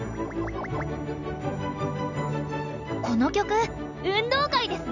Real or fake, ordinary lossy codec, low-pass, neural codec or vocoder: real; none; 7.2 kHz; none